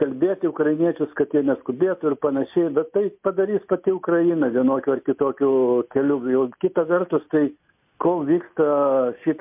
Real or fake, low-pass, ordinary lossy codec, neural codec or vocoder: real; 3.6 kHz; MP3, 32 kbps; none